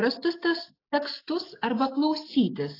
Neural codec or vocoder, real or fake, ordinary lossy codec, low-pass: none; real; AAC, 24 kbps; 5.4 kHz